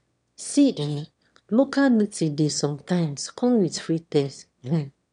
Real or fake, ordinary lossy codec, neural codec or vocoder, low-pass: fake; none; autoencoder, 22.05 kHz, a latent of 192 numbers a frame, VITS, trained on one speaker; 9.9 kHz